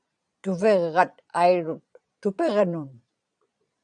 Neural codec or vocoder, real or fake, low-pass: vocoder, 22.05 kHz, 80 mel bands, Vocos; fake; 9.9 kHz